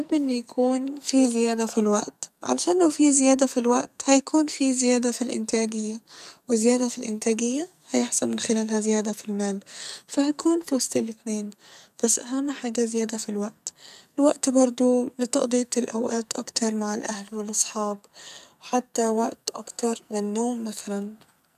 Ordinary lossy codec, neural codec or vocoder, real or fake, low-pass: none; codec, 44.1 kHz, 2.6 kbps, SNAC; fake; 14.4 kHz